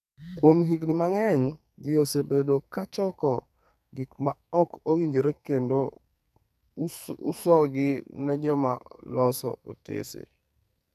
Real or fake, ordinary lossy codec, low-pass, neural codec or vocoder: fake; none; 14.4 kHz; codec, 44.1 kHz, 2.6 kbps, SNAC